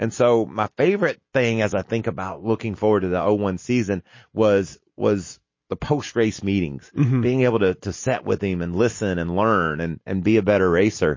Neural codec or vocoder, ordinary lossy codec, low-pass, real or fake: none; MP3, 32 kbps; 7.2 kHz; real